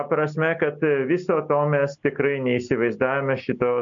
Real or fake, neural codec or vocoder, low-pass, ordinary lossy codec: real; none; 7.2 kHz; AAC, 64 kbps